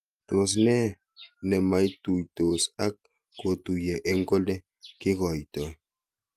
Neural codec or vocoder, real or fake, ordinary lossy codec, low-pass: none; real; Opus, 32 kbps; 14.4 kHz